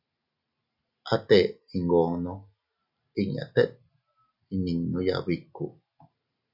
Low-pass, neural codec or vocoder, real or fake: 5.4 kHz; none; real